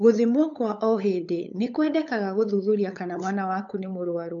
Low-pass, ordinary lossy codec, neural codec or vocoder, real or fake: 7.2 kHz; AAC, 64 kbps; codec, 16 kHz, 8 kbps, FunCodec, trained on LibriTTS, 25 frames a second; fake